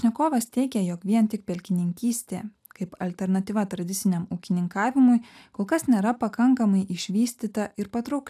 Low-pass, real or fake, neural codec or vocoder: 14.4 kHz; real; none